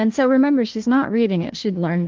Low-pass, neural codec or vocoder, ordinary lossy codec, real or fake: 7.2 kHz; codec, 16 kHz, 1 kbps, FunCodec, trained on Chinese and English, 50 frames a second; Opus, 16 kbps; fake